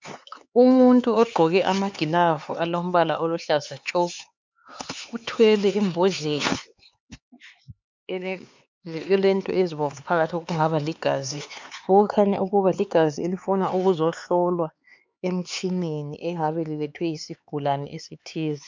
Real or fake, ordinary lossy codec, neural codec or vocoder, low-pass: fake; MP3, 64 kbps; codec, 16 kHz, 4 kbps, X-Codec, HuBERT features, trained on LibriSpeech; 7.2 kHz